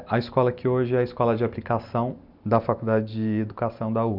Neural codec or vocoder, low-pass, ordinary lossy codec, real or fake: none; 5.4 kHz; none; real